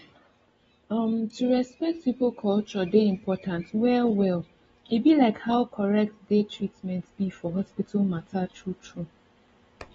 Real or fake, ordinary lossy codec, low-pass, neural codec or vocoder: real; AAC, 24 kbps; 10.8 kHz; none